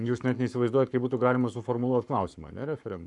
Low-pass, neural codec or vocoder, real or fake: 10.8 kHz; codec, 44.1 kHz, 7.8 kbps, Pupu-Codec; fake